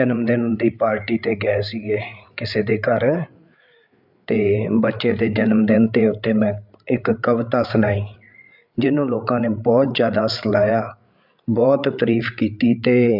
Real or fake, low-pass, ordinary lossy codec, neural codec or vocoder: fake; 5.4 kHz; none; codec, 16 kHz, 8 kbps, FreqCodec, larger model